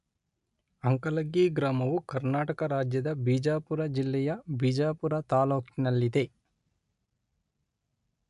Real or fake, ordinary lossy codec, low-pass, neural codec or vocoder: real; AAC, 96 kbps; 10.8 kHz; none